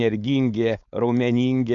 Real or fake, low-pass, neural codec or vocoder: fake; 7.2 kHz; codec, 16 kHz, 4.8 kbps, FACodec